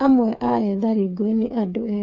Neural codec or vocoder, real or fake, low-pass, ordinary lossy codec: codec, 16 kHz, 8 kbps, FreqCodec, smaller model; fake; 7.2 kHz; none